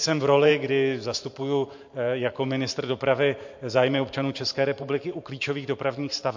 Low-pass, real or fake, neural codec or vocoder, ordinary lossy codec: 7.2 kHz; fake; vocoder, 44.1 kHz, 128 mel bands every 512 samples, BigVGAN v2; MP3, 48 kbps